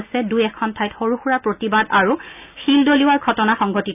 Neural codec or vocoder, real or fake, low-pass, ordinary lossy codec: none; real; 3.6 kHz; none